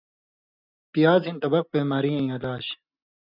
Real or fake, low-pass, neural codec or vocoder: real; 5.4 kHz; none